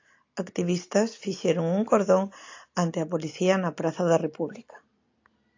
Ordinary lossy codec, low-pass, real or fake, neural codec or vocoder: AAC, 48 kbps; 7.2 kHz; real; none